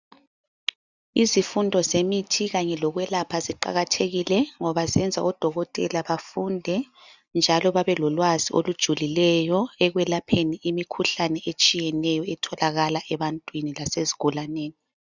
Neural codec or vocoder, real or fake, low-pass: none; real; 7.2 kHz